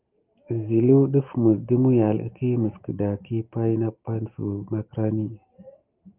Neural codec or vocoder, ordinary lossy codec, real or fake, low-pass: none; Opus, 32 kbps; real; 3.6 kHz